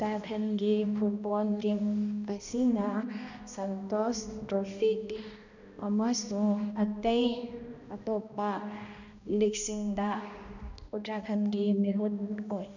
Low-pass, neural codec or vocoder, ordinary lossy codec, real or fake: 7.2 kHz; codec, 16 kHz, 1 kbps, X-Codec, HuBERT features, trained on balanced general audio; none; fake